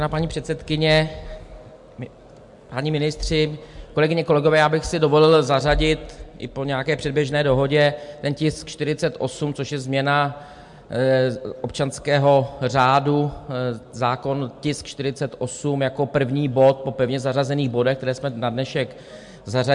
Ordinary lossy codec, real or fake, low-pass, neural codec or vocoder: MP3, 64 kbps; real; 10.8 kHz; none